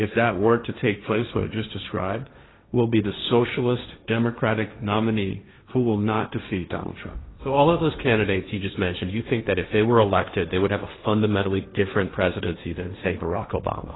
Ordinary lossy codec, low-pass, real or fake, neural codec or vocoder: AAC, 16 kbps; 7.2 kHz; fake; codec, 16 kHz, 1.1 kbps, Voila-Tokenizer